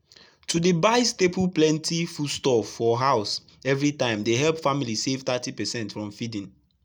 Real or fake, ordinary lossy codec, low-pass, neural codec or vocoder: real; none; none; none